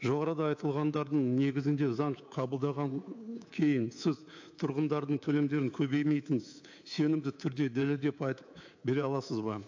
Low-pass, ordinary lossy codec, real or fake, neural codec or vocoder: 7.2 kHz; AAC, 48 kbps; fake; codec, 24 kHz, 3.1 kbps, DualCodec